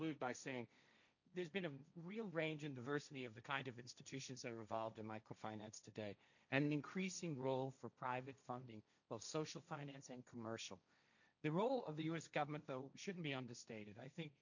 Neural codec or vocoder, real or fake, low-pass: codec, 16 kHz, 1.1 kbps, Voila-Tokenizer; fake; 7.2 kHz